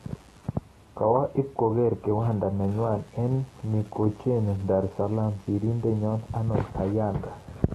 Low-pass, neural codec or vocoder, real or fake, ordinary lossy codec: 19.8 kHz; none; real; AAC, 32 kbps